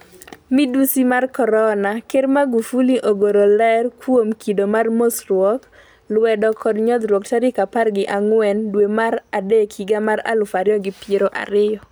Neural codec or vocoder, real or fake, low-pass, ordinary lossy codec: none; real; none; none